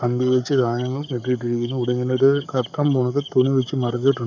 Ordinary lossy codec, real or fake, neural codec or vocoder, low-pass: none; fake; codec, 44.1 kHz, 7.8 kbps, Pupu-Codec; 7.2 kHz